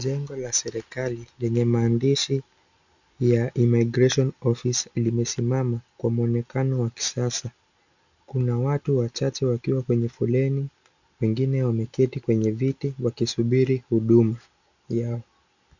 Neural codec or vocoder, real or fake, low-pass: none; real; 7.2 kHz